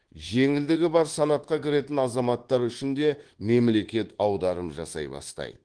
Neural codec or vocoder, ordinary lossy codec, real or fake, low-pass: codec, 24 kHz, 1.2 kbps, DualCodec; Opus, 16 kbps; fake; 9.9 kHz